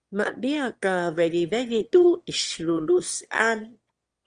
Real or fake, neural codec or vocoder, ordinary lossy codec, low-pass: fake; autoencoder, 22.05 kHz, a latent of 192 numbers a frame, VITS, trained on one speaker; Opus, 24 kbps; 9.9 kHz